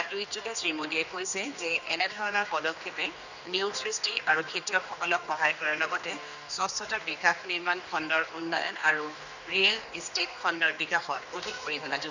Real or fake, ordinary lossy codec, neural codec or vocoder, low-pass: fake; none; codec, 16 kHz, 2 kbps, X-Codec, HuBERT features, trained on general audio; 7.2 kHz